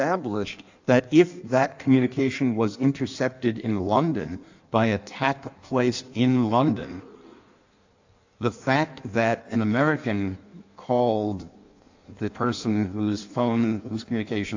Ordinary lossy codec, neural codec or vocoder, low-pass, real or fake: AAC, 48 kbps; codec, 16 kHz in and 24 kHz out, 1.1 kbps, FireRedTTS-2 codec; 7.2 kHz; fake